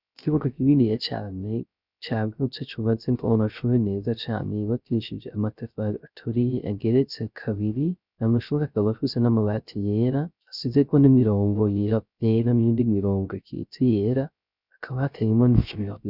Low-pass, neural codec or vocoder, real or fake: 5.4 kHz; codec, 16 kHz, 0.3 kbps, FocalCodec; fake